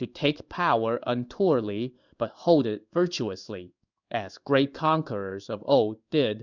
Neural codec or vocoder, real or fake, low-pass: codec, 16 kHz in and 24 kHz out, 1 kbps, XY-Tokenizer; fake; 7.2 kHz